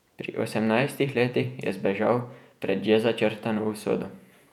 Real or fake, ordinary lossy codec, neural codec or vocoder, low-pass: fake; none; vocoder, 48 kHz, 128 mel bands, Vocos; 19.8 kHz